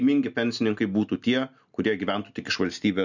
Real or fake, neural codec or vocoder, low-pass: real; none; 7.2 kHz